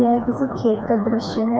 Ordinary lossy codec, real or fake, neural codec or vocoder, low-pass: none; fake; codec, 16 kHz, 2 kbps, FreqCodec, smaller model; none